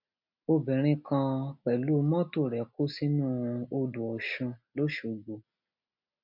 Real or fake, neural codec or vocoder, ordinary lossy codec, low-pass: real; none; MP3, 48 kbps; 5.4 kHz